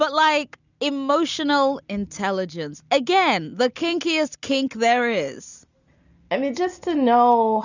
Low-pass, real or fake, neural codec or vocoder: 7.2 kHz; real; none